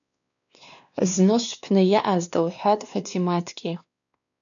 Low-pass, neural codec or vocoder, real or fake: 7.2 kHz; codec, 16 kHz, 2 kbps, X-Codec, WavLM features, trained on Multilingual LibriSpeech; fake